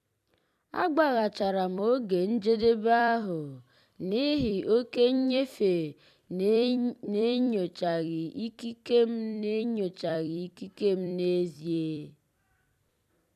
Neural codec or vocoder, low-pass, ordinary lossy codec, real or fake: vocoder, 44.1 kHz, 128 mel bands every 256 samples, BigVGAN v2; 14.4 kHz; none; fake